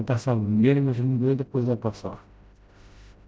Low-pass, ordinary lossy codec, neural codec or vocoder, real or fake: none; none; codec, 16 kHz, 0.5 kbps, FreqCodec, smaller model; fake